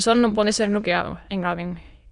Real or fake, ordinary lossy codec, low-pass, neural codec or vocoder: fake; Opus, 64 kbps; 9.9 kHz; autoencoder, 22.05 kHz, a latent of 192 numbers a frame, VITS, trained on many speakers